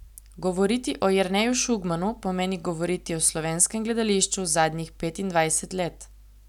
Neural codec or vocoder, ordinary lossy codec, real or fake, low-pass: none; none; real; 19.8 kHz